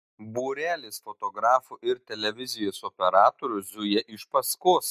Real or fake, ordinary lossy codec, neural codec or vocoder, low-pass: real; MP3, 96 kbps; none; 14.4 kHz